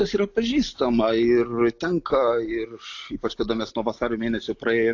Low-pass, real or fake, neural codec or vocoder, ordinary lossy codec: 7.2 kHz; real; none; AAC, 48 kbps